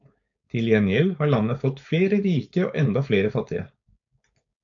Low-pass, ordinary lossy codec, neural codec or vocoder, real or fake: 7.2 kHz; AAC, 64 kbps; codec, 16 kHz, 4.8 kbps, FACodec; fake